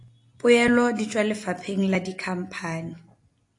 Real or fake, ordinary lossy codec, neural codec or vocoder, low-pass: real; AAC, 48 kbps; none; 10.8 kHz